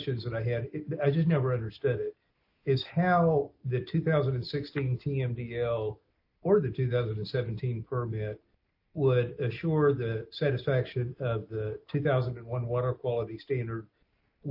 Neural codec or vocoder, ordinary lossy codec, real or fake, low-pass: none; AAC, 48 kbps; real; 5.4 kHz